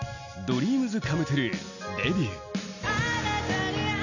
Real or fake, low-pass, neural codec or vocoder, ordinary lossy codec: real; 7.2 kHz; none; none